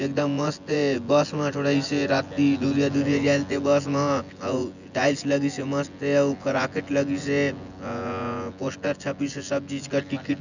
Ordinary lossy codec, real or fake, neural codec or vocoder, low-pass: none; fake; vocoder, 24 kHz, 100 mel bands, Vocos; 7.2 kHz